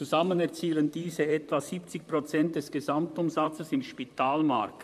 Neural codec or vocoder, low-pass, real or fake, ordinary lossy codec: vocoder, 44.1 kHz, 128 mel bands, Pupu-Vocoder; 14.4 kHz; fake; MP3, 96 kbps